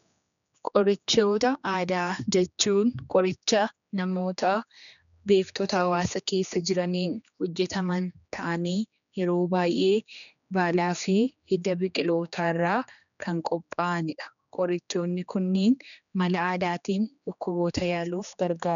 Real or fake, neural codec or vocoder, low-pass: fake; codec, 16 kHz, 2 kbps, X-Codec, HuBERT features, trained on general audio; 7.2 kHz